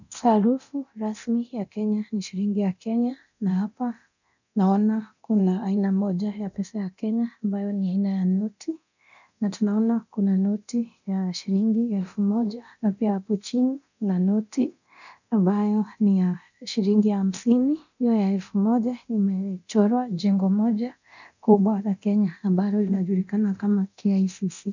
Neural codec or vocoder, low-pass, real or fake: codec, 24 kHz, 0.9 kbps, DualCodec; 7.2 kHz; fake